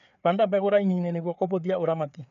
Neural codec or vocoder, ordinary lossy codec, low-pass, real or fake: codec, 16 kHz, 4 kbps, FreqCodec, larger model; none; 7.2 kHz; fake